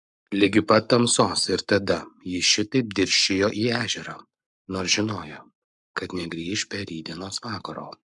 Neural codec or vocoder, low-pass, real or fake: codec, 44.1 kHz, 7.8 kbps, Pupu-Codec; 10.8 kHz; fake